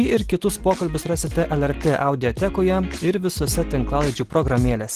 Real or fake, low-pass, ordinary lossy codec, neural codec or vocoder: real; 14.4 kHz; Opus, 16 kbps; none